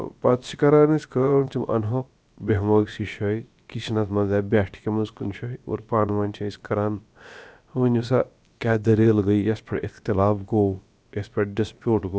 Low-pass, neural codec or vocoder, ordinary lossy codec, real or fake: none; codec, 16 kHz, about 1 kbps, DyCAST, with the encoder's durations; none; fake